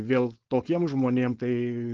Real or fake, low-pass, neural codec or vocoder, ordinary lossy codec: fake; 7.2 kHz; codec, 16 kHz, 4.8 kbps, FACodec; Opus, 16 kbps